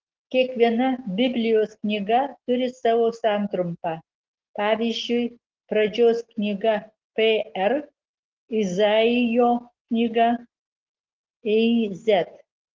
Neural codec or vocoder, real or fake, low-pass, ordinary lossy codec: none; real; 7.2 kHz; Opus, 16 kbps